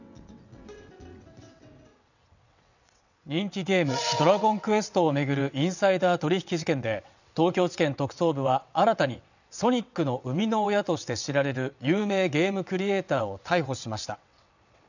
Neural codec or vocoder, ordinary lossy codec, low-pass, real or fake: vocoder, 22.05 kHz, 80 mel bands, WaveNeXt; none; 7.2 kHz; fake